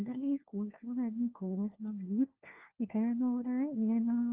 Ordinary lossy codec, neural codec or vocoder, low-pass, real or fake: Opus, 16 kbps; codec, 16 kHz, 1 kbps, FunCodec, trained on LibriTTS, 50 frames a second; 3.6 kHz; fake